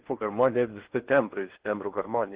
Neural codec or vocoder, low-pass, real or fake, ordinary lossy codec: codec, 16 kHz in and 24 kHz out, 0.6 kbps, FocalCodec, streaming, 4096 codes; 3.6 kHz; fake; Opus, 16 kbps